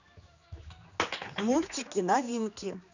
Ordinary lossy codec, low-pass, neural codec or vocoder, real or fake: none; 7.2 kHz; codec, 16 kHz, 2 kbps, X-Codec, HuBERT features, trained on general audio; fake